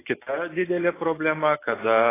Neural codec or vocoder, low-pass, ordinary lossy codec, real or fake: none; 3.6 kHz; AAC, 16 kbps; real